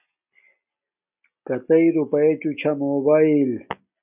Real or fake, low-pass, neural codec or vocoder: real; 3.6 kHz; none